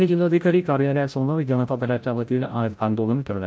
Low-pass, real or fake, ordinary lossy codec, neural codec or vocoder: none; fake; none; codec, 16 kHz, 0.5 kbps, FreqCodec, larger model